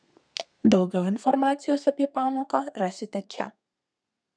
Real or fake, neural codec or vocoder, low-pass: fake; codec, 32 kHz, 1.9 kbps, SNAC; 9.9 kHz